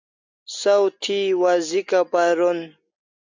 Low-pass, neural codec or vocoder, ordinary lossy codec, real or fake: 7.2 kHz; none; MP3, 64 kbps; real